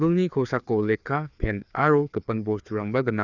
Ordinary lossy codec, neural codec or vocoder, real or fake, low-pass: none; codec, 16 kHz, 2 kbps, FreqCodec, larger model; fake; 7.2 kHz